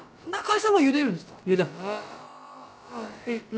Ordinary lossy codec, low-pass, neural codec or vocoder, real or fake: none; none; codec, 16 kHz, about 1 kbps, DyCAST, with the encoder's durations; fake